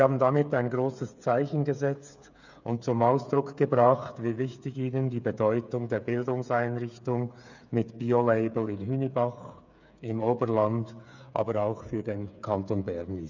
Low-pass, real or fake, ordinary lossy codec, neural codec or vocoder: 7.2 kHz; fake; none; codec, 16 kHz, 8 kbps, FreqCodec, smaller model